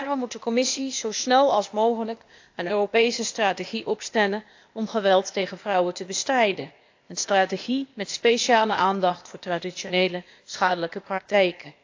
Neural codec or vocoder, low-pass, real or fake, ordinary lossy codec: codec, 16 kHz, 0.8 kbps, ZipCodec; 7.2 kHz; fake; AAC, 48 kbps